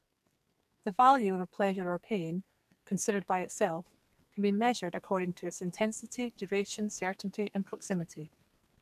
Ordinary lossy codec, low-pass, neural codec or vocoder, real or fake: none; 14.4 kHz; codec, 44.1 kHz, 2.6 kbps, SNAC; fake